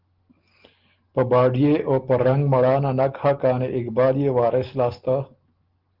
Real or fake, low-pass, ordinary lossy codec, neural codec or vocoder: real; 5.4 kHz; Opus, 16 kbps; none